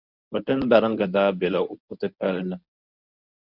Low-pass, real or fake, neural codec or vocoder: 5.4 kHz; fake; codec, 24 kHz, 0.9 kbps, WavTokenizer, medium speech release version 1